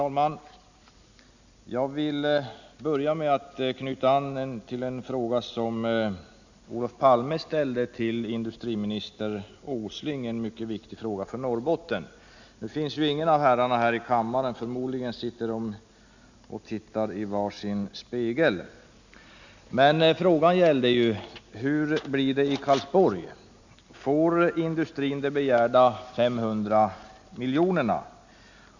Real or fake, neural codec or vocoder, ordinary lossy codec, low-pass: real; none; none; 7.2 kHz